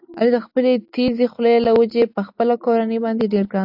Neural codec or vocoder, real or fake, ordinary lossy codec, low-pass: none; real; AAC, 48 kbps; 5.4 kHz